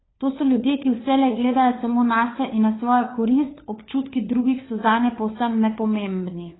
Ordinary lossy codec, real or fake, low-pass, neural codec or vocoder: AAC, 16 kbps; fake; 7.2 kHz; codec, 16 kHz, 4 kbps, FunCodec, trained on LibriTTS, 50 frames a second